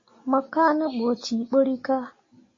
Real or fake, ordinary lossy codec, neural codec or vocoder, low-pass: real; AAC, 32 kbps; none; 7.2 kHz